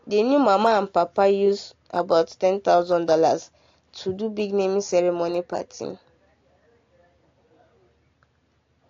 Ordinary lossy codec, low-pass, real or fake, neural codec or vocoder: AAC, 48 kbps; 7.2 kHz; real; none